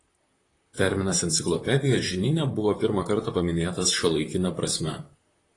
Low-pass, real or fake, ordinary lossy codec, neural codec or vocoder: 10.8 kHz; fake; AAC, 32 kbps; vocoder, 44.1 kHz, 128 mel bands, Pupu-Vocoder